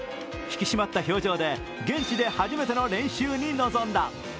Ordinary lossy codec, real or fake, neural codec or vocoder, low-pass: none; real; none; none